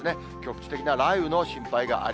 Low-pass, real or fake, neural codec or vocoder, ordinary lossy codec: none; real; none; none